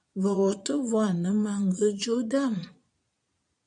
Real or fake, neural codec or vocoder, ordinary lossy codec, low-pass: fake; vocoder, 22.05 kHz, 80 mel bands, Vocos; MP3, 96 kbps; 9.9 kHz